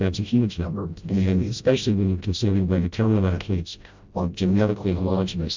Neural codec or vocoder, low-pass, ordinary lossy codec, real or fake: codec, 16 kHz, 0.5 kbps, FreqCodec, smaller model; 7.2 kHz; MP3, 64 kbps; fake